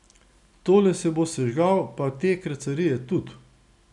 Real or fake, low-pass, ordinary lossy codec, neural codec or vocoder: real; 10.8 kHz; none; none